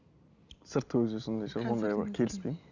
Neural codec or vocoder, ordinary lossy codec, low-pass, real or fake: none; none; 7.2 kHz; real